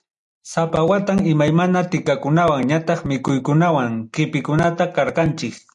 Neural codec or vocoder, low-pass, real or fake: none; 10.8 kHz; real